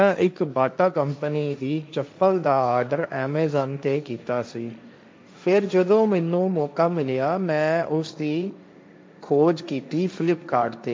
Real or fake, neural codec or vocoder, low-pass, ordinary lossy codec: fake; codec, 16 kHz, 1.1 kbps, Voila-Tokenizer; none; none